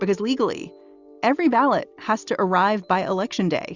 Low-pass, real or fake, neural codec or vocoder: 7.2 kHz; real; none